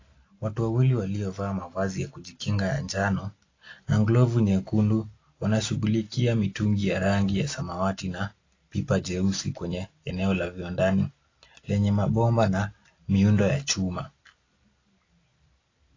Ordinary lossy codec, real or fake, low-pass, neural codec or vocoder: AAC, 32 kbps; real; 7.2 kHz; none